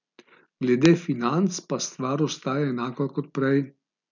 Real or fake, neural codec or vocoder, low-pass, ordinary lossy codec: real; none; 7.2 kHz; none